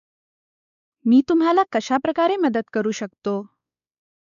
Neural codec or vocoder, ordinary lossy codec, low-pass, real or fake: codec, 16 kHz, 4 kbps, X-Codec, WavLM features, trained on Multilingual LibriSpeech; none; 7.2 kHz; fake